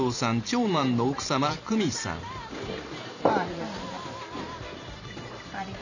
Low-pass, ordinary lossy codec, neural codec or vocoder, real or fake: 7.2 kHz; none; vocoder, 44.1 kHz, 128 mel bands every 512 samples, BigVGAN v2; fake